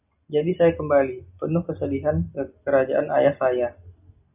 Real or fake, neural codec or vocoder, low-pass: real; none; 3.6 kHz